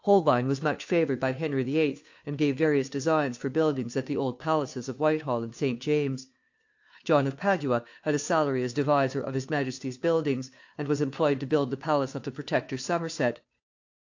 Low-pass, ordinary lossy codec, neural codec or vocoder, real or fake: 7.2 kHz; AAC, 48 kbps; codec, 16 kHz, 2 kbps, FunCodec, trained on Chinese and English, 25 frames a second; fake